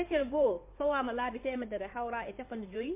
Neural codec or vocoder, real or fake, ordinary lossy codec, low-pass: codec, 16 kHz in and 24 kHz out, 2.2 kbps, FireRedTTS-2 codec; fake; MP3, 24 kbps; 3.6 kHz